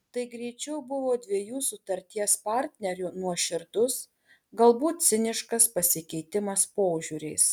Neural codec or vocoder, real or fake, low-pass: none; real; 19.8 kHz